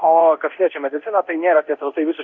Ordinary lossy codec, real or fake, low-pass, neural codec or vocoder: Opus, 64 kbps; fake; 7.2 kHz; codec, 24 kHz, 0.9 kbps, DualCodec